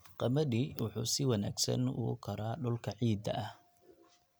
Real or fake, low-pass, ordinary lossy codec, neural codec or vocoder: real; none; none; none